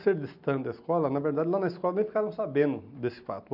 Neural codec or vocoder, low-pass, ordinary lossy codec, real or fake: none; 5.4 kHz; none; real